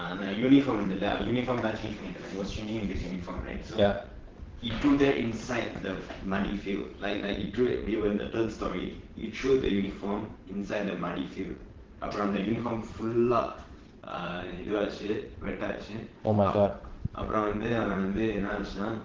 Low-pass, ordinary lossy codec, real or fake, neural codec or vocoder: 7.2 kHz; Opus, 16 kbps; fake; vocoder, 22.05 kHz, 80 mel bands, WaveNeXt